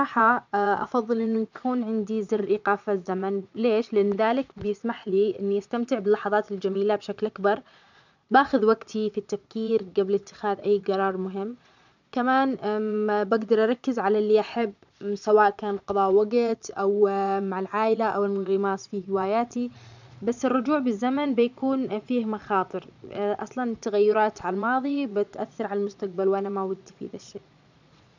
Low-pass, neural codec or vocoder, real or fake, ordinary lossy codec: 7.2 kHz; vocoder, 22.05 kHz, 80 mel bands, Vocos; fake; none